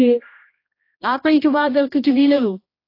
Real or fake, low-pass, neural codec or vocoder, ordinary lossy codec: fake; 5.4 kHz; codec, 16 kHz, 1 kbps, X-Codec, HuBERT features, trained on general audio; AAC, 32 kbps